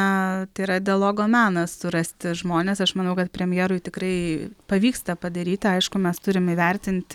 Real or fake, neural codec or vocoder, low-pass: real; none; 19.8 kHz